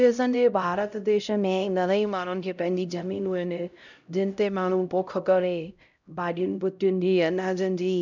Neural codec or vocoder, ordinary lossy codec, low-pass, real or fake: codec, 16 kHz, 0.5 kbps, X-Codec, HuBERT features, trained on LibriSpeech; none; 7.2 kHz; fake